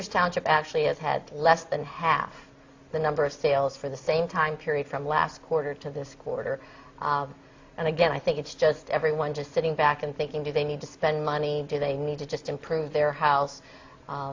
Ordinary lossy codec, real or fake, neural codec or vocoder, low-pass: AAC, 48 kbps; real; none; 7.2 kHz